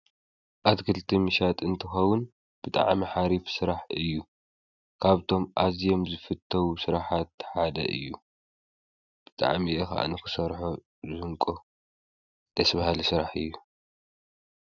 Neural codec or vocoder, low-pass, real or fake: none; 7.2 kHz; real